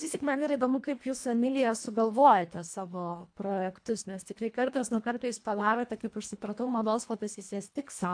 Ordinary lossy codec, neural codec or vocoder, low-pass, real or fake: AAC, 64 kbps; codec, 24 kHz, 1.5 kbps, HILCodec; 9.9 kHz; fake